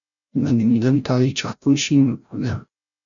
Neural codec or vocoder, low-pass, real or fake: codec, 16 kHz, 0.5 kbps, FreqCodec, larger model; 7.2 kHz; fake